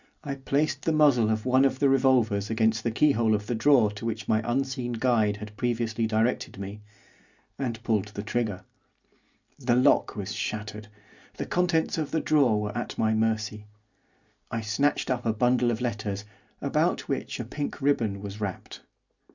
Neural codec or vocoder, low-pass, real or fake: none; 7.2 kHz; real